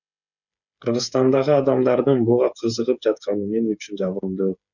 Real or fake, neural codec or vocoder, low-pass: fake; codec, 16 kHz, 8 kbps, FreqCodec, smaller model; 7.2 kHz